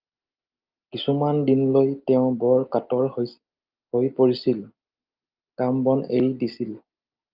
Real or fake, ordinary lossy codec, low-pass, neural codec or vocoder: real; Opus, 24 kbps; 5.4 kHz; none